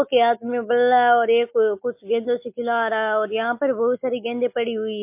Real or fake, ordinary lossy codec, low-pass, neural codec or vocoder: real; MP3, 24 kbps; 3.6 kHz; none